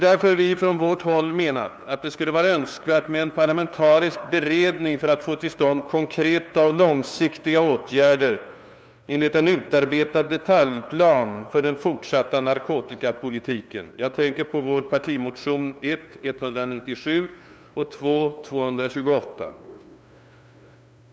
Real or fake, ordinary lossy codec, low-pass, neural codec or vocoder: fake; none; none; codec, 16 kHz, 2 kbps, FunCodec, trained on LibriTTS, 25 frames a second